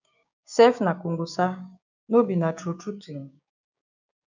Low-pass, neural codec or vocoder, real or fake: 7.2 kHz; codec, 16 kHz, 6 kbps, DAC; fake